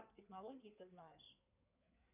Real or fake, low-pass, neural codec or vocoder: fake; 3.6 kHz; codec, 16 kHz in and 24 kHz out, 2.2 kbps, FireRedTTS-2 codec